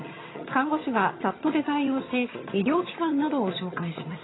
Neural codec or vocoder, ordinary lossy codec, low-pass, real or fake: vocoder, 22.05 kHz, 80 mel bands, HiFi-GAN; AAC, 16 kbps; 7.2 kHz; fake